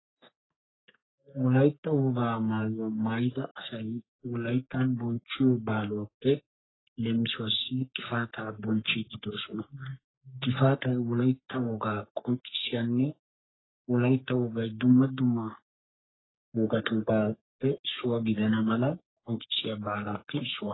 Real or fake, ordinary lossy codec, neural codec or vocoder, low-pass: fake; AAC, 16 kbps; codec, 44.1 kHz, 3.4 kbps, Pupu-Codec; 7.2 kHz